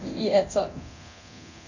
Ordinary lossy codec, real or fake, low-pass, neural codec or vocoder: none; fake; 7.2 kHz; codec, 24 kHz, 0.9 kbps, DualCodec